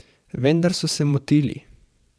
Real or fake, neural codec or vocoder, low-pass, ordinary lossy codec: fake; vocoder, 22.05 kHz, 80 mel bands, WaveNeXt; none; none